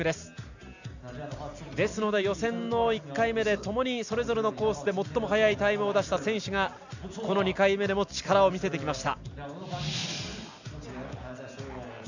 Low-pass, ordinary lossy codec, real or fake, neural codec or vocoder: 7.2 kHz; none; real; none